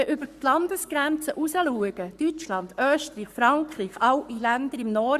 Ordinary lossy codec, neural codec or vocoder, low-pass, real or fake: Opus, 64 kbps; codec, 44.1 kHz, 7.8 kbps, Pupu-Codec; 14.4 kHz; fake